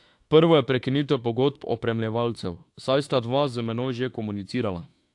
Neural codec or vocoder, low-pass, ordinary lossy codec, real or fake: autoencoder, 48 kHz, 32 numbers a frame, DAC-VAE, trained on Japanese speech; 10.8 kHz; AAC, 64 kbps; fake